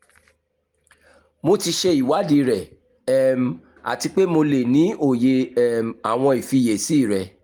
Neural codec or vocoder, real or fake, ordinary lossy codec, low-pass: none; real; Opus, 32 kbps; 19.8 kHz